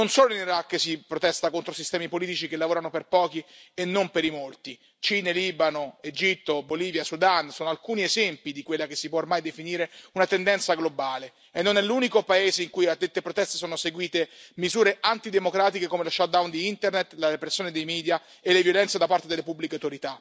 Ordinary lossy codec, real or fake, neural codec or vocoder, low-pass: none; real; none; none